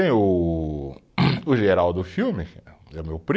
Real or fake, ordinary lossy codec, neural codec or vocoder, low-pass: real; none; none; none